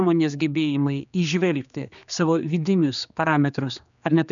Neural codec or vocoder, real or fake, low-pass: codec, 16 kHz, 2 kbps, X-Codec, HuBERT features, trained on general audio; fake; 7.2 kHz